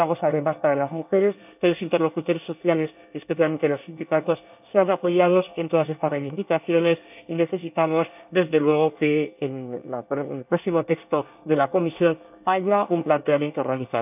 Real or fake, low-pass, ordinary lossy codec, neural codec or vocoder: fake; 3.6 kHz; none; codec, 24 kHz, 1 kbps, SNAC